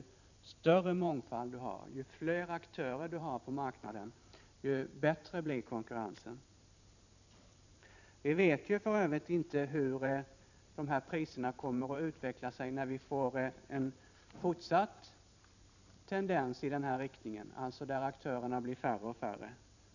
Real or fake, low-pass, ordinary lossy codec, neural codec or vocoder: fake; 7.2 kHz; none; vocoder, 44.1 kHz, 128 mel bands every 512 samples, BigVGAN v2